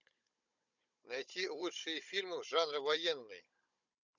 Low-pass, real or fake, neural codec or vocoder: 7.2 kHz; fake; codec, 16 kHz, 16 kbps, FunCodec, trained on Chinese and English, 50 frames a second